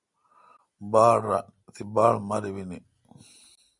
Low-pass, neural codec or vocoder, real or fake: 10.8 kHz; none; real